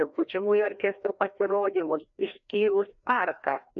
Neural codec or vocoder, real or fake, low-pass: codec, 16 kHz, 1 kbps, FreqCodec, larger model; fake; 7.2 kHz